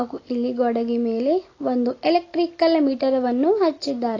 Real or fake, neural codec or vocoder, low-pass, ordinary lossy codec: real; none; 7.2 kHz; AAC, 32 kbps